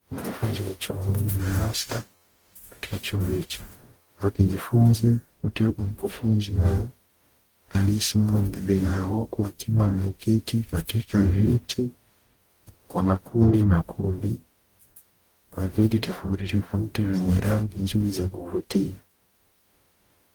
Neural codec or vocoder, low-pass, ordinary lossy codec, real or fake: codec, 44.1 kHz, 0.9 kbps, DAC; 19.8 kHz; Opus, 24 kbps; fake